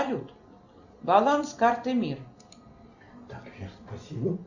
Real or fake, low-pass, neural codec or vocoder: real; 7.2 kHz; none